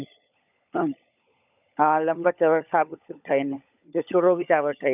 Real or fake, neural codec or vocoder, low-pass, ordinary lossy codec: fake; codec, 16 kHz, 16 kbps, FunCodec, trained on LibriTTS, 50 frames a second; 3.6 kHz; none